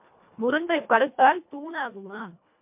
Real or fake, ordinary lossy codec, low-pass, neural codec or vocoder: fake; MP3, 32 kbps; 3.6 kHz; codec, 24 kHz, 1.5 kbps, HILCodec